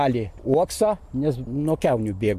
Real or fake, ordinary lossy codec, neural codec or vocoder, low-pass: real; MP3, 64 kbps; none; 14.4 kHz